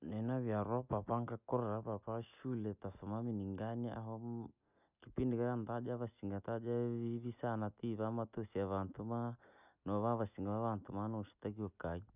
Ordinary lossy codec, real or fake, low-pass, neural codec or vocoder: none; real; 3.6 kHz; none